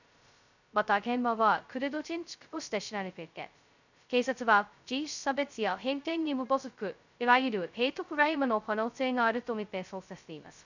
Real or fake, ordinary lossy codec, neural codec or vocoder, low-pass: fake; none; codec, 16 kHz, 0.2 kbps, FocalCodec; 7.2 kHz